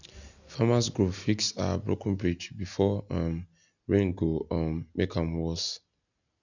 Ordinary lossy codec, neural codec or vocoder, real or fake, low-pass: none; none; real; 7.2 kHz